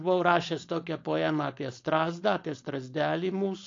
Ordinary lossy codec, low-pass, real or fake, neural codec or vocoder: AAC, 48 kbps; 7.2 kHz; fake; codec, 16 kHz, 4.8 kbps, FACodec